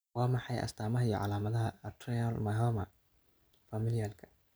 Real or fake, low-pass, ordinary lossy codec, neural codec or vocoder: real; none; none; none